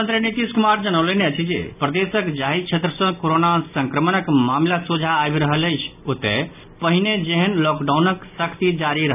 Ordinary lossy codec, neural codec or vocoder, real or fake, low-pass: none; none; real; 3.6 kHz